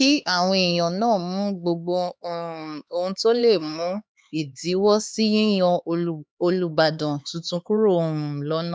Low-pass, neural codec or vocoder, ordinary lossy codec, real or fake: none; codec, 16 kHz, 4 kbps, X-Codec, HuBERT features, trained on LibriSpeech; none; fake